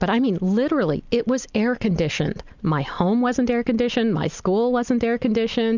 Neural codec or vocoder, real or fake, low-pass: none; real; 7.2 kHz